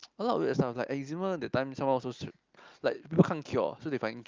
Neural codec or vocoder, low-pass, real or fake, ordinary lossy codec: none; 7.2 kHz; real; Opus, 24 kbps